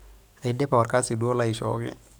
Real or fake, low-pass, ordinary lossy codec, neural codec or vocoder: fake; none; none; codec, 44.1 kHz, 7.8 kbps, DAC